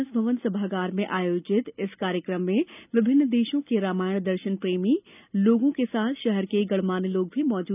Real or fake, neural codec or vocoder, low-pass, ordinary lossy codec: real; none; 3.6 kHz; none